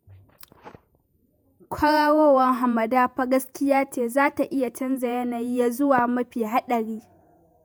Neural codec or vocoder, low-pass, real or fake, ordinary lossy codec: vocoder, 48 kHz, 128 mel bands, Vocos; none; fake; none